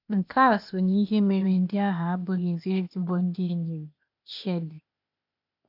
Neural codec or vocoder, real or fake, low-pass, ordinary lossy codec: codec, 16 kHz, 0.8 kbps, ZipCodec; fake; 5.4 kHz; none